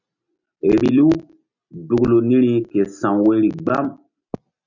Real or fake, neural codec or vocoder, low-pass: real; none; 7.2 kHz